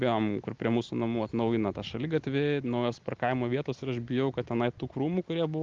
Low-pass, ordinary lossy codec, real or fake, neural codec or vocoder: 7.2 kHz; Opus, 32 kbps; real; none